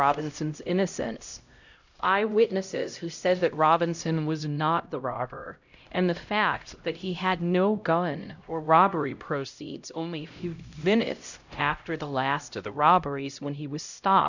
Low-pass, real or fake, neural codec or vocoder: 7.2 kHz; fake; codec, 16 kHz, 0.5 kbps, X-Codec, HuBERT features, trained on LibriSpeech